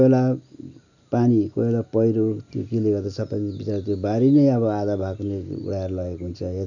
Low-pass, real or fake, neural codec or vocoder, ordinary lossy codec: 7.2 kHz; real; none; none